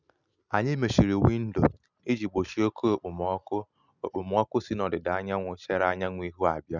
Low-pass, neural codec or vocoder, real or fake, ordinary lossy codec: 7.2 kHz; none; real; none